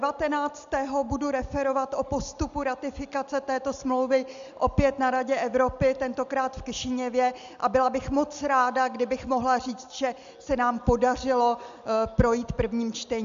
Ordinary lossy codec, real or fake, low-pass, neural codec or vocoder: MP3, 64 kbps; real; 7.2 kHz; none